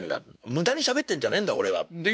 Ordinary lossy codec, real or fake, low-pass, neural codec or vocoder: none; fake; none; codec, 16 kHz, 2 kbps, X-Codec, WavLM features, trained on Multilingual LibriSpeech